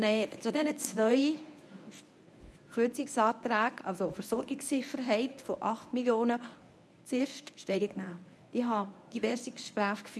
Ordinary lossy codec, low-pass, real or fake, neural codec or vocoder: none; none; fake; codec, 24 kHz, 0.9 kbps, WavTokenizer, medium speech release version 1